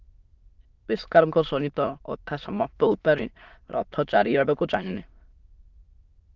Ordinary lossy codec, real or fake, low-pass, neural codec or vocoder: Opus, 24 kbps; fake; 7.2 kHz; autoencoder, 22.05 kHz, a latent of 192 numbers a frame, VITS, trained on many speakers